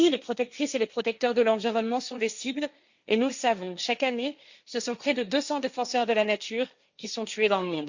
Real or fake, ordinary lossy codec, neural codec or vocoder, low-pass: fake; Opus, 64 kbps; codec, 16 kHz, 1.1 kbps, Voila-Tokenizer; 7.2 kHz